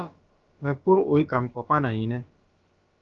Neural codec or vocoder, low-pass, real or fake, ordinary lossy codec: codec, 16 kHz, about 1 kbps, DyCAST, with the encoder's durations; 7.2 kHz; fake; Opus, 32 kbps